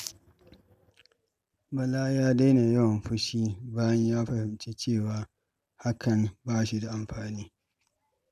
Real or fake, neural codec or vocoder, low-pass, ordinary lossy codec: real; none; 14.4 kHz; none